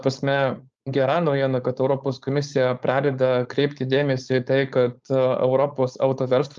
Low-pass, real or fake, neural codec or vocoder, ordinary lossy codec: 7.2 kHz; fake; codec, 16 kHz, 4.8 kbps, FACodec; Opus, 24 kbps